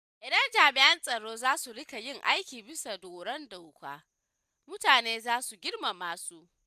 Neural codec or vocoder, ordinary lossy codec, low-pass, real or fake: none; none; 14.4 kHz; real